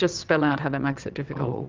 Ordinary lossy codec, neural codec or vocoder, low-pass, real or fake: Opus, 32 kbps; vocoder, 22.05 kHz, 80 mel bands, WaveNeXt; 7.2 kHz; fake